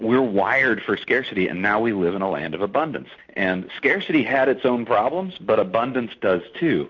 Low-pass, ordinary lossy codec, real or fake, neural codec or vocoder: 7.2 kHz; MP3, 48 kbps; real; none